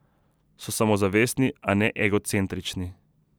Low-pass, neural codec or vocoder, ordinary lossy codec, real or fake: none; none; none; real